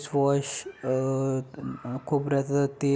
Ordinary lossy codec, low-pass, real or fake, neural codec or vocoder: none; none; real; none